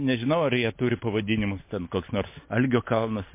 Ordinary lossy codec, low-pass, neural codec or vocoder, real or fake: MP3, 24 kbps; 3.6 kHz; none; real